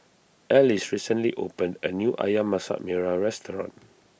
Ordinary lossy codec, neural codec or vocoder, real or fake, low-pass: none; none; real; none